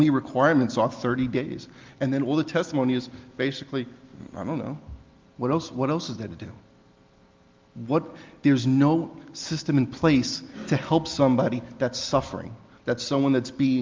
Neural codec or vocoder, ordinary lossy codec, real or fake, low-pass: none; Opus, 32 kbps; real; 7.2 kHz